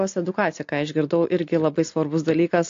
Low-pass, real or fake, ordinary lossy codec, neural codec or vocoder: 7.2 kHz; real; AAC, 48 kbps; none